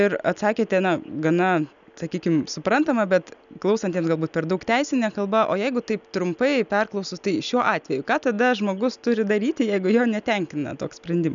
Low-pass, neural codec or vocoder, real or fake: 7.2 kHz; none; real